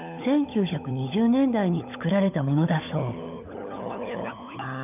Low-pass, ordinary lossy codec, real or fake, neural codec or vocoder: 3.6 kHz; none; fake; codec, 16 kHz, 16 kbps, FunCodec, trained on LibriTTS, 50 frames a second